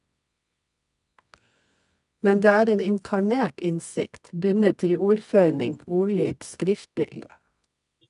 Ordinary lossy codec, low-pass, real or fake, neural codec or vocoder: none; 10.8 kHz; fake; codec, 24 kHz, 0.9 kbps, WavTokenizer, medium music audio release